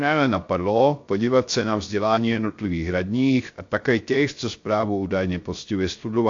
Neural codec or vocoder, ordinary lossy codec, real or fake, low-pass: codec, 16 kHz, 0.3 kbps, FocalCodec; AAC, 48 kbps; fake; 7.2 kHz